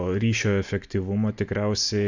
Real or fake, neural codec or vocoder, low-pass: real; none; 7.2 kHz